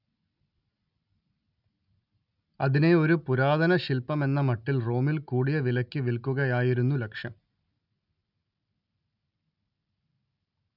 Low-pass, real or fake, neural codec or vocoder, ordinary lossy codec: 5.4 kHz; real; none; none